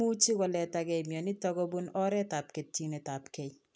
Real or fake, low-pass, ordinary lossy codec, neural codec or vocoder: real; none; none; none